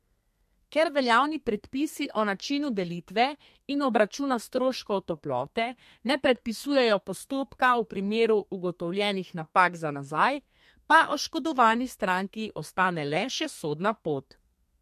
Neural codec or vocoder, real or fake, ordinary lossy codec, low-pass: codec, 32 kHz, 1.9 kbps, SNAC; fake; MP3, 64 kbps; 14.4 kHz